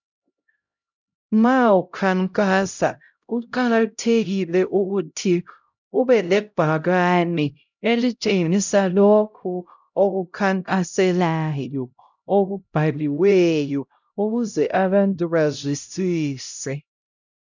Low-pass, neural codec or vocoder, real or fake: 7.2 kHz; codec, 16 kHz, 0.5 kbps, X-Codec, HuBERT features, trained on LibriSpeech; fake